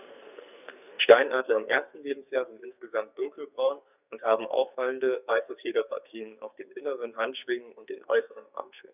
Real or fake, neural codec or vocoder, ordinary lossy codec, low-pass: fake; codec, 44.1 kHz, 2.6 kbps, SNAC; none; 3.6 kHz